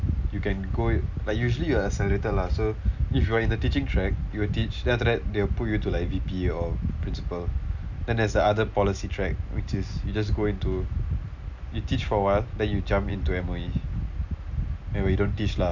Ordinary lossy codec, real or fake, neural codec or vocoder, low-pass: none; real; none; 7.2 kHz